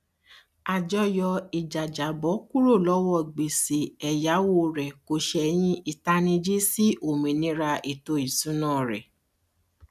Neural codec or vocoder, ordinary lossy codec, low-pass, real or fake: none; none; 14.4 kHz; real